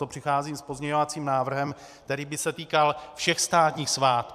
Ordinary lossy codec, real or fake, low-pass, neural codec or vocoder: MP3, 96 kbps; fake; 14.4 kHz; vocoder, 44.1 kHz, 128 mel bands every 256 samples, BigVGAN v2